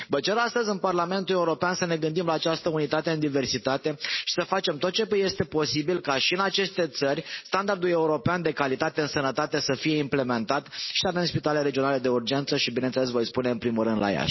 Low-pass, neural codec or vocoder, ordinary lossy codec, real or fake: 7.2 kHz; none; MP3, 24 kbps; real